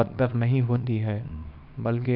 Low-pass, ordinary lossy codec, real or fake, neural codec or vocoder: 5.4 kHz; none; fake; codec, 24 kHz, 0.9 kbps, WavTokenizer, small release